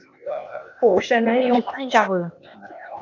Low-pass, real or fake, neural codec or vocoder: 7.2 kHz; fake; codec, 16 kHz, 0.8 kbps, ZipCodec